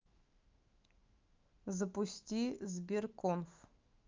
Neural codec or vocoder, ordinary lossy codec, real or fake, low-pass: autoencoder, 48 kHz, 128 numbers a frame, DAC-VAE, trained on Japanese speech; Opus, 24 kbps; fake; 7.2 kHz